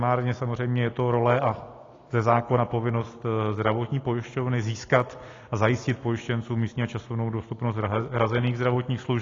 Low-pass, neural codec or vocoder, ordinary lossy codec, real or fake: 7.2 kHz; none; AAC, 32 kbps; real